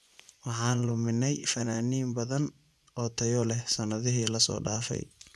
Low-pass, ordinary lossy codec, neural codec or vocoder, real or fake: none; none; vocoder, 24 kHz, 100 mel bands, Vocos; fake